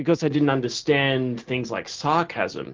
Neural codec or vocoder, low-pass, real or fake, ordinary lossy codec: none; 7.2 kHz; real; Opus, 32 kbps